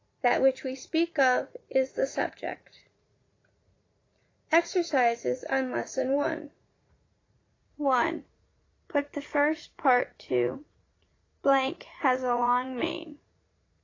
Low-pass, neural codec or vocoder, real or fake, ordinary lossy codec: 7.2 kHz; vocoder, 44.1 kHz, 80 mel bands, Vocos; fake; AAC, 32 kbps